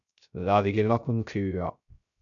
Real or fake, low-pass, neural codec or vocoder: fake; 7.2 kHz; codec, 16 kHz, 0.3 kbps, FocalCodec